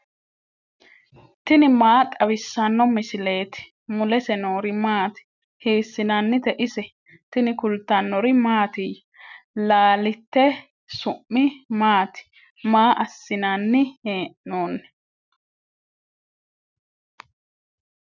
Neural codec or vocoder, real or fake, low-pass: none; real; 7.2 kHz